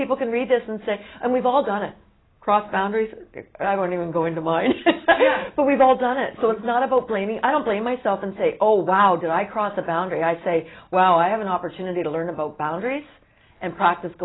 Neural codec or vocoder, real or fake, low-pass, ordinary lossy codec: none; real; 7.2 kHz; AAC, 16 kbps